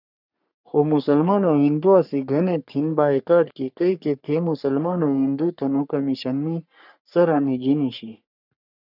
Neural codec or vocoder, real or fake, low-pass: codec, 44.1 kHz, 3.4 kbps, Pupu-Codec; fake; 5.4 kHz